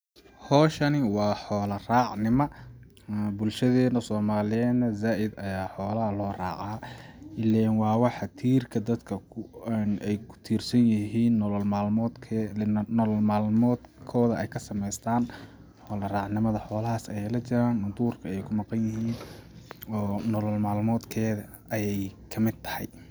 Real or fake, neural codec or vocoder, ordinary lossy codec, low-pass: real; none; none; none